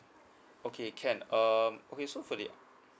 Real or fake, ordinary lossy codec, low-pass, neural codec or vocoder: real; none; none; none